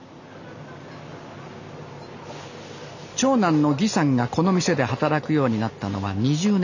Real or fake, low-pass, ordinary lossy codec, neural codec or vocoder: real; 7.2 kHz; none; none